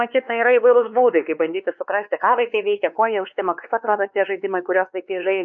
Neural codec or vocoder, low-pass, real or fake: codec, 16 kHz, 2 kbps, X-Codec, WavLM features, trained on Multilingual LibriSpeech; 7.2 kHz; fake